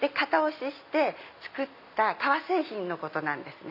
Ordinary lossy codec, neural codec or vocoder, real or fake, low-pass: none; none; real; 5.4 kHz